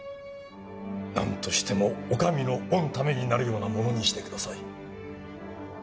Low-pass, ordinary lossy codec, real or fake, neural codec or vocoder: none; none; real; none